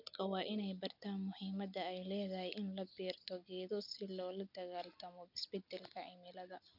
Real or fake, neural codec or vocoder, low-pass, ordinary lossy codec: real; none; 5.4 kHz; none